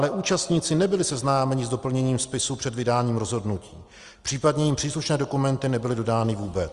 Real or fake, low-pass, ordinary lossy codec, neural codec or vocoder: real; 10.8 kHz; Opus, 32 kbps; none